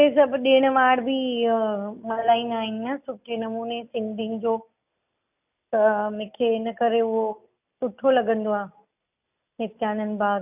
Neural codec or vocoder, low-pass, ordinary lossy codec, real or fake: none; 3.6 kHz; none; real